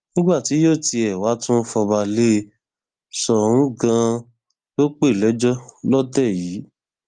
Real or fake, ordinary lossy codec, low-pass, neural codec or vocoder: real; Opus, 24 kbps; 9.9 kHz; none